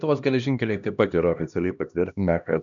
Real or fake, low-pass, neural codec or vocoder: fake; 7.2 kHz; codec, 16 kHz, 1 kbps, X-Codec, HuBERT features, trained on LibriSpeech